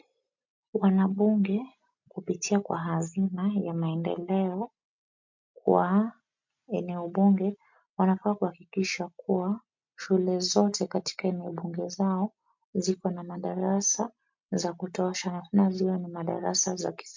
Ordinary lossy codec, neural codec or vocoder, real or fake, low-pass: MP3, 48 kbps; none; real; 7.2 kHz